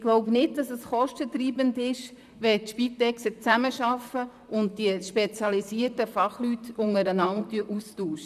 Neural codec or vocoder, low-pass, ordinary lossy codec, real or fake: vocoder, 44.1 kHz, 128 mel bands, Pupu-Vocoder; 14.4 kHz; none; fake